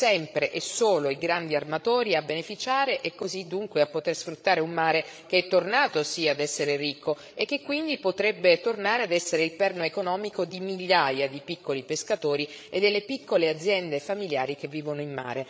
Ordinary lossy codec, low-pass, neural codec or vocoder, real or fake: none; none; codec, 16 kHz, 16 kbps, FreqCodec, larger model; fake